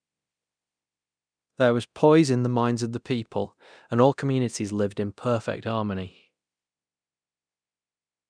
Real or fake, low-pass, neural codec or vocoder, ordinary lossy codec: fake; 9.9 kHz; codec, 24 kHz, 0.9 kbps, DualCodec; none